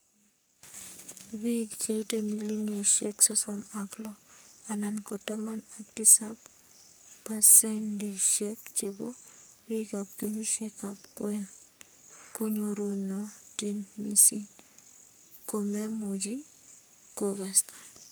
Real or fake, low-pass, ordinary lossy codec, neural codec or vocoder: fake; none; none; codec, 44.1 kHz, 3.4 kbps, Pupu-Codec